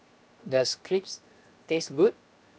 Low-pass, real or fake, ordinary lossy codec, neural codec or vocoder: none; fake; none; codec, 16 kHz, 0.7 kbps, FocalCodec